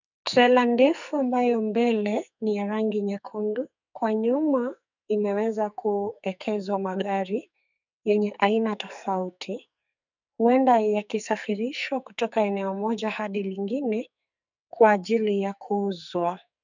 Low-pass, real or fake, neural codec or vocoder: 7.2 kHz; fake; codec, 44.1 kHz, 2.6 kbps, SNAC